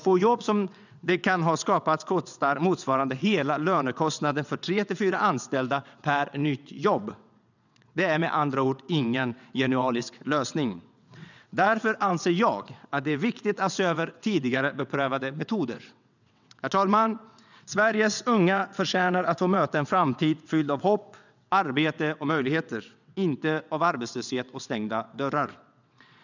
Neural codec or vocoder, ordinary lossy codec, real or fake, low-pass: vocoder, 22.05 kHz, 80 mel bands, WaveNeXt; none; fake; 7.2 kHz